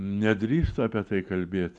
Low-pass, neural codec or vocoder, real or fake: 10.8 kHz; none; real